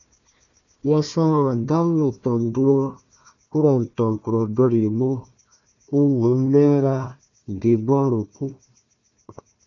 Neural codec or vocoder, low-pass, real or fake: codec, 16 kHz, 1 kbps, FunCodec, trained on Chinese and English, 50 frames a second; 7.2 kHz; fake